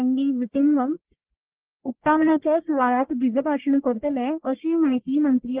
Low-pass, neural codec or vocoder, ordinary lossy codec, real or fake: 3.6 kHz; codec, 44.1 kHz, 1.7 kbps, Pupu-Codec; Opus, 16 kbps; fake